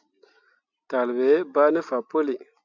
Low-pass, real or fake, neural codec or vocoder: 7.2 kHz; real; none